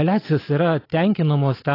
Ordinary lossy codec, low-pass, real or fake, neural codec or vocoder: AAC, 24 kbps; 5.4 kHz; real; none